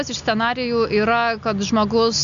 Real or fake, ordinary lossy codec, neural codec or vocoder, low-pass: real; AAC, 96 kbps; none; 7.2 kHz